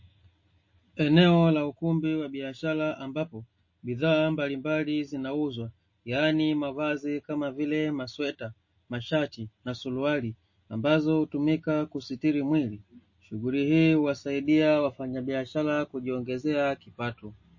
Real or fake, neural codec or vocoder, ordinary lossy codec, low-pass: real; none; MP3, 32 kbps; 7.2 kHz